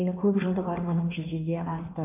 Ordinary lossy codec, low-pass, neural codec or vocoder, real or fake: MP3, 32 kbps; 3.6 kHz; codec, 16 kHz in and 24 kHz out, 1.1 kbps, FireRedTTS-2 codec; fake